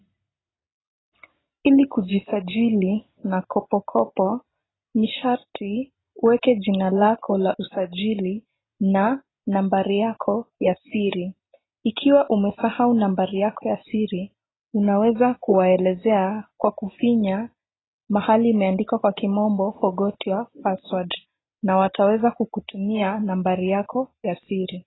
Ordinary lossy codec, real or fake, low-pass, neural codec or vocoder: AAC, 16 kbps; real; 7.2 kHz; none